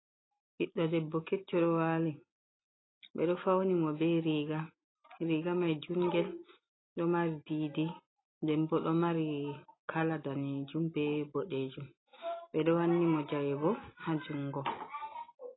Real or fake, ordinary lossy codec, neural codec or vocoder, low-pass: real; AAC, 16 kbps; none; 7.2 kHz